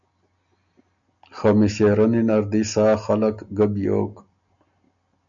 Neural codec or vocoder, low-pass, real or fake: none; 7.2 kHz; real